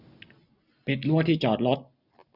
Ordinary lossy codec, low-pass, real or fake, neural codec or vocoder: none; 5.4 kHz; real; none